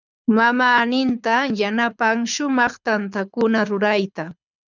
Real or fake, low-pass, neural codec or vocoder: fake; 7.2 kHz; codec, 24 kHz, 6 kbps, HILCodec